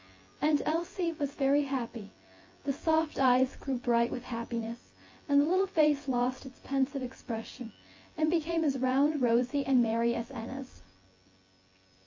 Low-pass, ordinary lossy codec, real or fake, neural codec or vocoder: 7.2 kHz; MP3, 32 kbps; fake; vocoder, 24 kHz, 100 mel bands, Vocos